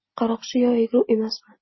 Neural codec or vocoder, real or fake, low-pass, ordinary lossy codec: none; real; 7.2 kHz; MP3, 24 kbps